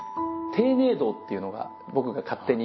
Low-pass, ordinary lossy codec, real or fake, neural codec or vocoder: 7.2 kHz; MP3, 24 kbps; real; none